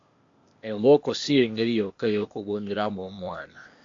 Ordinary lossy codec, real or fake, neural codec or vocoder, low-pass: MP3, 48 kbps; fake; codec, 16 kHz, 0.8 kbps, ZipCodec; 7.2 kHz